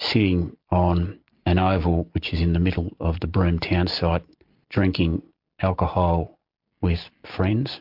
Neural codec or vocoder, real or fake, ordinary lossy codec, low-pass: none; real; MP3, 48 kbps; 5.4 kHz